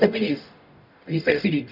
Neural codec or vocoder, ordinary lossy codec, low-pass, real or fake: codec, 44.1 kHz, 0.9 kbps, DAC; none; 5.4 kHz; fake